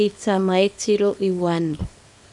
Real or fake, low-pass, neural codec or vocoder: fake; 10.8 kHz; codec, 24 kHz, 0.9 kbps, WavTokenizer, small release